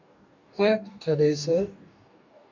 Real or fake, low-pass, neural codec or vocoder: fake; 7.2 kHz; codec, 44.1 kHz, 2.6 kbps, DAC